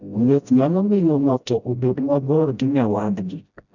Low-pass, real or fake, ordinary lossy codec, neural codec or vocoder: 7.2 kHz; fake; none; codec, 16 kHz, 0.5 kbps, FreqCodec, smaller model